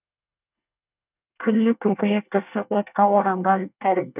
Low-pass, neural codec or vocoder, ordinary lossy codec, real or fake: 3.6 kHz; codec, 24 kHz, 1 kbps, SNAC; none; fake